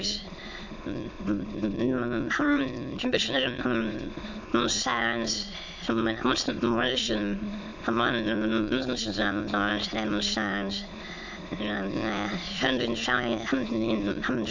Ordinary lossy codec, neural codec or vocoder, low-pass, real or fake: MP3, 64 kbps; autoencoder, 22.05 kHz, a latent of 192 numbers a frame, VITS, trained on many speakers; 7.2 kHz; fake